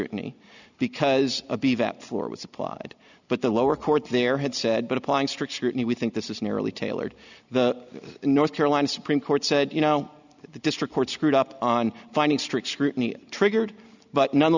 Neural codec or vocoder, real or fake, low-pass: none; real; 7.2 kHz